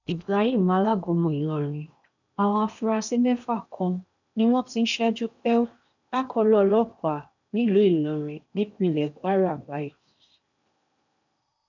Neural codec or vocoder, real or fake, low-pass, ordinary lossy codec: codec, 16 kHz in and 24 kHz out, 0.8 kbps, FocalCodec, streaming, 65536 codes; fake; 7.2 kHz; none